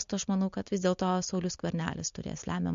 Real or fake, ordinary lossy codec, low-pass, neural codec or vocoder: real; MP3, 48 kbps; 7.2 kHz; none